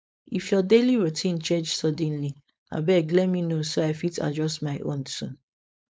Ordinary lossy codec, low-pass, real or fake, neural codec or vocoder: none; none; fake; codec, 16 kHz, 4.8 kbps, FACodec